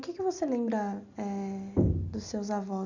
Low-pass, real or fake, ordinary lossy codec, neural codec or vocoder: 7.2 kHz; real; none; none